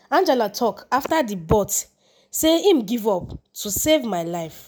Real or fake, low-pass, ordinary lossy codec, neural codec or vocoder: real; none; none; none